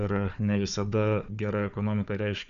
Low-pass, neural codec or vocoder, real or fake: 7.2 kHz; codec, 16 kHz, 4 kbps, FunCodec, trained on Chinese and English, 50 frames a second; fake